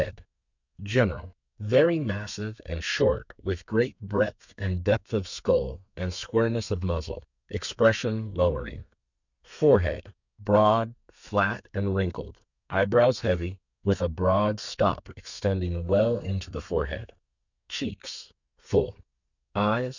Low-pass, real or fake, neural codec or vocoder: 7.2 kHz; fake; codec, 32 kHz, 1.9 kbps, SNAC